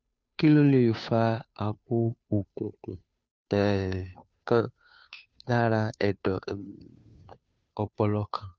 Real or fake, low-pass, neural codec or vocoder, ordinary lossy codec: fake; none; codec, 16 kHz, 2 kbps, FunCodec, trained on Chinese and English, 25 frames a second; none